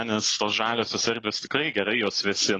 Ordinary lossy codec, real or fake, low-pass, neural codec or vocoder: AAC, 32 kbps; real; 9.9 kHz; none